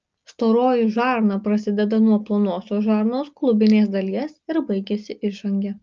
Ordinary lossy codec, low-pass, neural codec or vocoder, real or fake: Opus, 24 kbps; 7.2 kHz; none; real